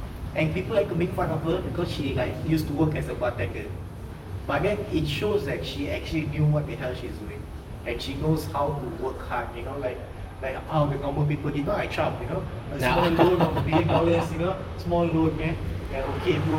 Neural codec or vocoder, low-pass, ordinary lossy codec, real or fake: vocoder, 44.1 kHz, 128 mel bands, Pupu-Vocoder; 19.8 kHz; none; fake